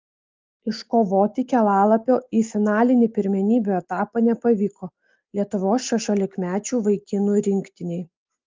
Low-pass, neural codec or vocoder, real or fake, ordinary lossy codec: 7.2 kHz; none; real; Opus, 32 kbps